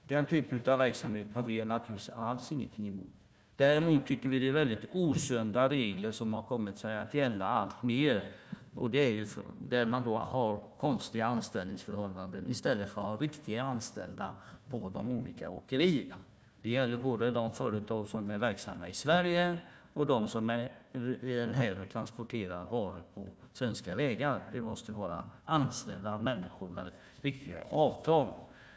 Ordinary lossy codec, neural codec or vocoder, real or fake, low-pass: none; codec, 16 kHz, 1 kbps, FunCodec, trained on Chinese and English, 50 frames a second; fake; none